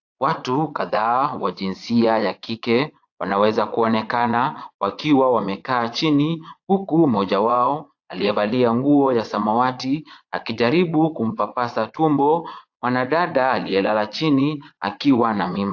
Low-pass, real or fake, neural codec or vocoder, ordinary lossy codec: 7.2 kHz; fake; vocoder, 22.05 kHz, 80 mel bands, Vocos; AAC, 48 kbps